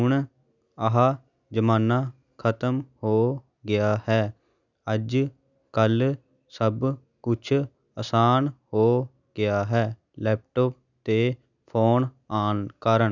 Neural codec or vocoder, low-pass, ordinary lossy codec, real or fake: none; 7.2 kHz; none; real